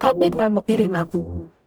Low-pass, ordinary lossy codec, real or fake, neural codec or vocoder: none; none; fake; codec, 44.1 kHz, 0.9 kbps, DAC